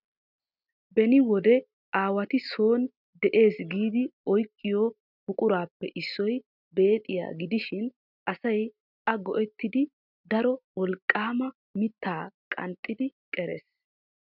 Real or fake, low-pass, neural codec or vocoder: real; 5.4 kHz; none